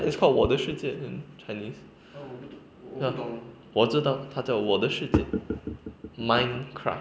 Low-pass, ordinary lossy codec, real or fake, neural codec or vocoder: none; none; real; none